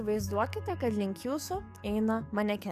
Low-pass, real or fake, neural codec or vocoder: 14.4 kHz; fake; codec, 44.1 kHz, 7.8 kbps, DAC